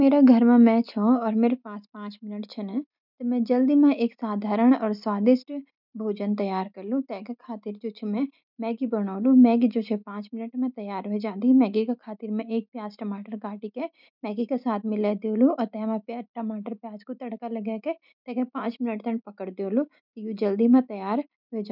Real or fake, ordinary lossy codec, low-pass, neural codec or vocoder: real; none; 5.4 kHz; none